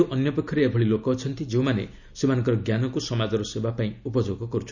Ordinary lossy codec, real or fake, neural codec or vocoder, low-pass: none; real; none; 7.2 kHz